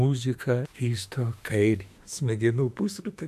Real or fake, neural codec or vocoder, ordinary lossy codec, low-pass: fake; autoencoder, 48 kHz, 32 numbers a frame, DAC-VAE, trained on Japanese speech; AAC, 96 kbps; 14.4 kHz